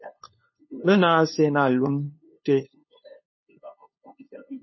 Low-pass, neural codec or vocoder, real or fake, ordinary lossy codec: 7.2 kHz; codec, 16 kHz, 2 kbps, FunCodec, trained on LibriTTS, 25 frames a second; fake; MP3, 24 kbps